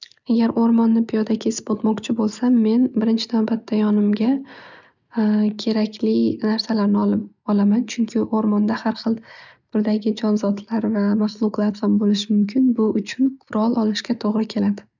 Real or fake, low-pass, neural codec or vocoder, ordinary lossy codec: real; 7.2 kHz; none; none